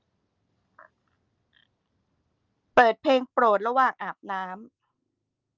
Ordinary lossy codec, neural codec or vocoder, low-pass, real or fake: Opus, 32 kbps; none; 7.2 kHz; real